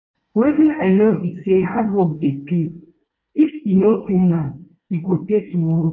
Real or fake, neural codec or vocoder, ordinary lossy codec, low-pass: fake; codec, 24 kHz, 1 kbps, SNAC; none; 7.2 kHz